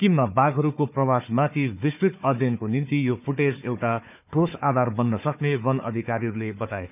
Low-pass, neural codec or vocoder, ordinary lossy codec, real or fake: 3.6 kHz; codec, 16 kHz, 4 kbps, FunCodec, trained on Chinese and English, 50 frames a second; none; fake